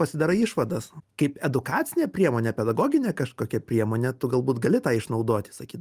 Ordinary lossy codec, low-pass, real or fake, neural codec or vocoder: Opus, 32 kbps; 14.4 kHz; real; none